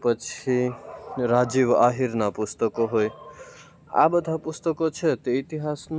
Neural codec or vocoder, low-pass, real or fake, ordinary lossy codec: none; none; real; none